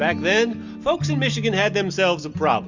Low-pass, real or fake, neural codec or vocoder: 7.2 kHz; real; none